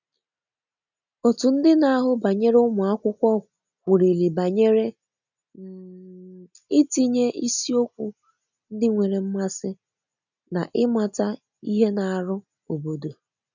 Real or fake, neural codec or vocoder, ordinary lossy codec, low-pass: real; none; none; 7.2 kHz